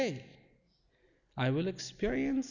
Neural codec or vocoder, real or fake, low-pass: none; real; 7.2 kHz